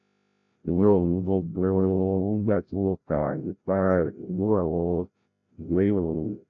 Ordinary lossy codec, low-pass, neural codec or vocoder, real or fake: none; 7.2 kHz; codec, 16 kHz, 0.5 kbps, FreqCodec, larger model; fake